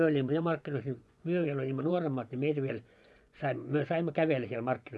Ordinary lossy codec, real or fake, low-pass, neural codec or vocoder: none; fake; none; vocoder, 24 kHz, 100 mel bands, Vocos